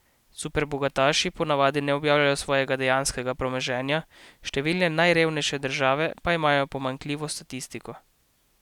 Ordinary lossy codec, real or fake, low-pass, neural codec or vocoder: none; real; 19.8 kHz; none